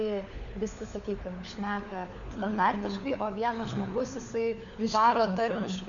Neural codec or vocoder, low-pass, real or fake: codec, 16 kHz, 4 kbps, FunCodec, trained on LibriTTS, 50 frames a second; 7.2 kHz; fake